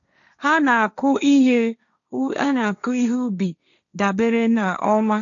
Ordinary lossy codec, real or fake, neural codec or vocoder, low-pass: none; fake; codec, 16 kHz, 1.1 kbps, Voila-Tokenizer; 7.2 kHz